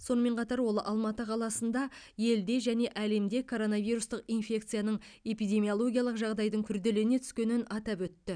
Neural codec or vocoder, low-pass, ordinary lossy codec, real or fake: none; 9.9 kHz; none; real